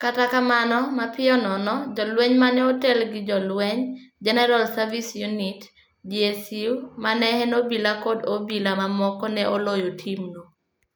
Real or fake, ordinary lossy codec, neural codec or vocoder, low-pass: real; none; none; none